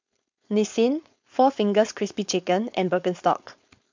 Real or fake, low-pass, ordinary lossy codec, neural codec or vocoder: fake; 7.2 kHz; MP3, 64 kbps; codec, 16 kHz, 4.8 kbps, FACodec